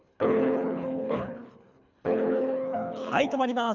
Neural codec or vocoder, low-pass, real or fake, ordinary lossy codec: codec, 24 kHz, 3 kbps, HILCodec; 7.2 kHz; fake; none